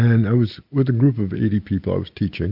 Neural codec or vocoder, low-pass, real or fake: none; 5.4 kHz; real